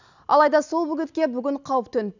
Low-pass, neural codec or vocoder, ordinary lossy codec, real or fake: 7.2 kHz; none; none; real